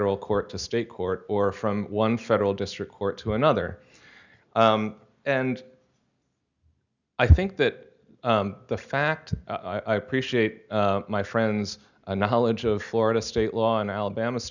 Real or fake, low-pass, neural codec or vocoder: real; 7.2 kHz; none